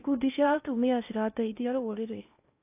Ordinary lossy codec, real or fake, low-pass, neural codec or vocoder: AAC, 32 kbps; fake; 3.6 kHz; codec, 16 kHz in and 24 kHz out, 0.6 kbps, FocalCodec, streaming, 4096 codes